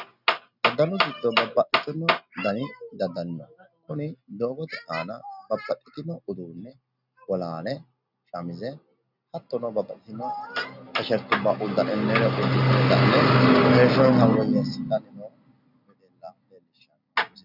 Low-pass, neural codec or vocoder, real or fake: 5.4 kHz; none; real